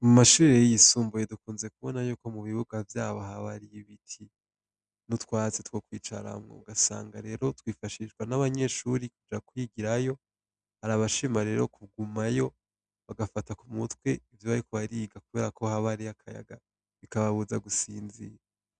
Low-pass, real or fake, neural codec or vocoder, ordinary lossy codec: 9.9 kHz; real; none; Opus, 64 kbps